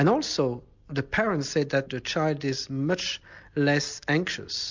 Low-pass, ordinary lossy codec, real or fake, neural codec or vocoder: 7.2 kHz; MP3, 64 kbps; real; none